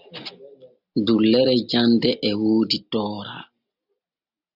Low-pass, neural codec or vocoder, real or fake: 5.4 kHz; none; real